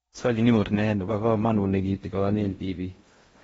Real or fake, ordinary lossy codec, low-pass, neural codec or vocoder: fake; AAC, 24 kbps; 10.8 kHz; codec, 16 kHz in and 24 kHz out, 0.6 kbps, FocalCodec, streaming, 4096 codes